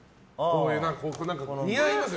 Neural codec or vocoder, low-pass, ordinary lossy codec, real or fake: none; none; none; real